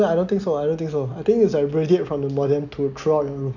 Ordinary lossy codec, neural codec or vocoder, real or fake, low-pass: none; none; real; 7.2 kHz